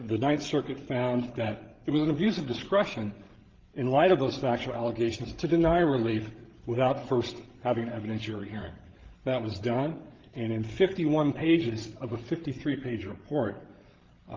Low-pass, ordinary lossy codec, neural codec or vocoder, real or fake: 7.2 kHz; Opus, 16 kbps; codec, 16 kHz, 16 kbps, FreqCodec, larger model; fake